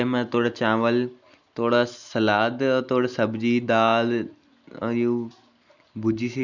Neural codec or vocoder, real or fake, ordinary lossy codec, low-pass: none; real; none; 7.2 kHz